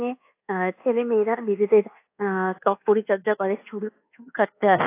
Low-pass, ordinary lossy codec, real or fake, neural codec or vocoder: 3.6 kHz; AAC, 24 kbps; fake; codec, 24 kHz, 1.2 kbps, DualCodec